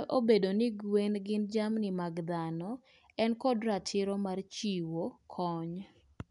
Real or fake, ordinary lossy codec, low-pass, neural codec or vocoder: real; none; 10.8 kHz; none